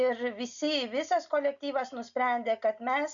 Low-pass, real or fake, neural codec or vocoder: 7.2 kHz; real; none